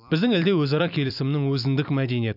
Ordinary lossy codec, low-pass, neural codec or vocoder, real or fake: none; 5.4 kHz; vocoder, 44.1 kHz, 128 mel bands every 256 samples, BigVGAN v2; fake